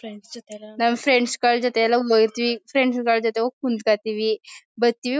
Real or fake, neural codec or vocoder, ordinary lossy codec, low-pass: real; none; none; none